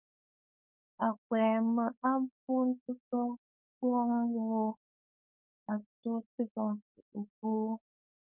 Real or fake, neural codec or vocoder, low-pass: fake; codec, 16 kHz, 4.8 kbps, FACodec; 3.6 kHz